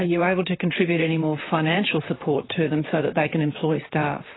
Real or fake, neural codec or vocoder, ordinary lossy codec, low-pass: fake; vocoder, 44.1 kHz, 128 mel bands, Pupu-Vocoder; AAC, 16 kbps; 7.2 kHz